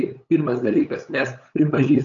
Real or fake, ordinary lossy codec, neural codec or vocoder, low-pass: fake; AAC, 64 kbps; codec, 16 kHz, 8 kbps, FunCodec, trained on LibriTTS, 25 frames a second; 7.2 kHz